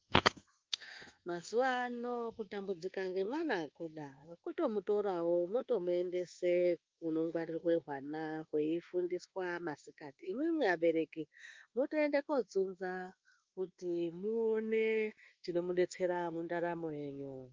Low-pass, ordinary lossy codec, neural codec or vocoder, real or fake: 7.2 kHz; Opus, 32 kbps; codec, 24 kHz, 1.2 kbps, DualCodec; fake